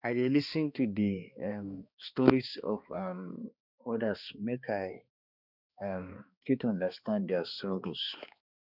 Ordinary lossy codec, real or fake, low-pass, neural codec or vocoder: none; fake; 5.4 kHz; codec, 16 kHz, 2 kbps, X-Codec, HuBERT features, trained on balanced general audio